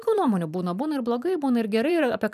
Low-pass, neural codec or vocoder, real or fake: 14.4 kHz; vocoder, 44.1 kHz, 128 mel bands every 512 samples, BigVGAN v2; fake